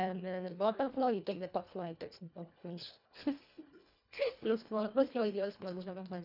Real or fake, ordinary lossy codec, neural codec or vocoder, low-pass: fake; none; codec, 24 kHz, 1.5 kbps, HILCodec; 5.4 kHz